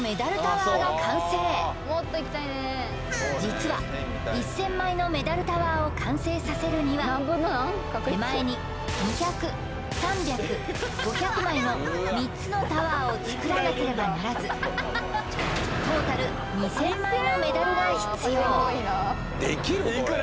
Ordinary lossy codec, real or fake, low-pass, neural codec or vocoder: none; real; none; none